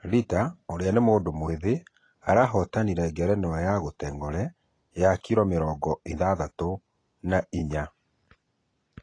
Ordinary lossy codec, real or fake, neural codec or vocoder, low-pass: AAC, 32 kbps; real; none; 9.9 kHz